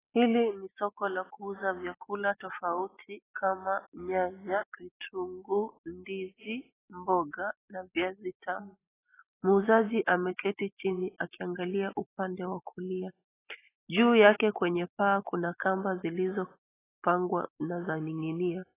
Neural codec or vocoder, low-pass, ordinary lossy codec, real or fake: none; 3.6 kHz; AAC, 16 kbps; real